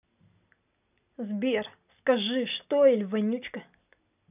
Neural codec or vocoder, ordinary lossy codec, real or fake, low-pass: none; none; real; 3.6 kHz